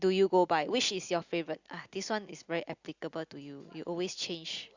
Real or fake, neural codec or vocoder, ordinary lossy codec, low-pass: real; none; Opus, 64 kbps; 7.2 kHz